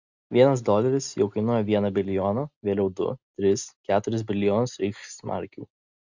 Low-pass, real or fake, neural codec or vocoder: 7.2 kHz; real; none